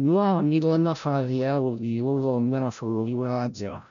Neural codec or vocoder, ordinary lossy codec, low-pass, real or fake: codec, 16 kHz, 0.5 kbps, FreqCodec, larger model; none; 7.2 kHz; fake